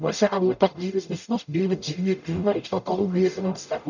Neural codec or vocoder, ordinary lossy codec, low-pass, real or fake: codec, 44.1 kHz, 0.9 kbps, DAC; none; 7.2 kHz; fake